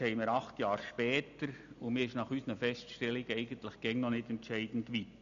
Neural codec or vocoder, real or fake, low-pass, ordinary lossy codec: none; real; 7.2 kHz; none